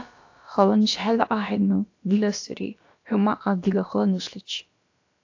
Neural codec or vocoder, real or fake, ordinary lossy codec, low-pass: codec, 16 kHz, about 1 kbps, DyCAST, with the encoder's durations; fake; AAC, 48 kbps; 7.2 kHz